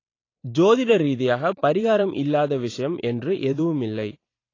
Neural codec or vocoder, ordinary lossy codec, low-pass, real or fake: vocoder, 44.1 kHz, 128 mel bands every 512 samples, BigVGAN v2; AAC, 32 kbps; 7.2 kHz; fake